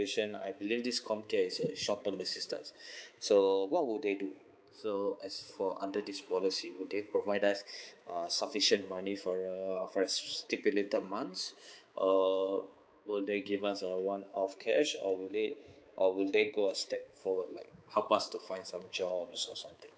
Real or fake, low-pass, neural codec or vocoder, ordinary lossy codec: fake; none; codec, 16 kHz, 4 kbps, X-Codec, HuBERT features, trained on balanced general audio; none